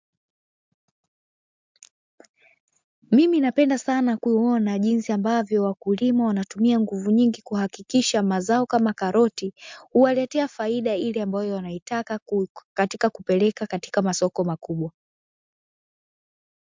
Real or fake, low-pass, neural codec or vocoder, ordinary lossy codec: real; 7.2 kHz; none; MP3, 64 kbps